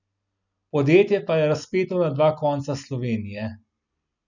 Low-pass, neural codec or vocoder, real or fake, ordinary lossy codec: 7.2 kHz; none; real; none